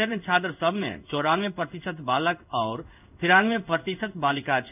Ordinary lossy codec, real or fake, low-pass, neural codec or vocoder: none; fake; 3.6 kHz; codec, 16 kHz in and 24 kHz out, 1 kbps, XY-Tokenizer